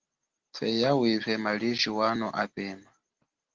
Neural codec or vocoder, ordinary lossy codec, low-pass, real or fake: none; Opus, 16 kbps; 7.2 kHz; real